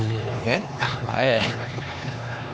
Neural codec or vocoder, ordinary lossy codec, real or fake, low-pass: codec, 16 kHz, 2 kbps, X-Codec, HuBERT features, trained on LibriSpeech; none; fake; none